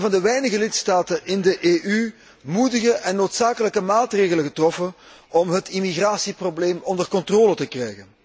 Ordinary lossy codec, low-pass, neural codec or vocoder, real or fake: none; none; none; real